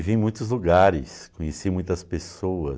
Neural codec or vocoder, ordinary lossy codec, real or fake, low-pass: none; none; real; none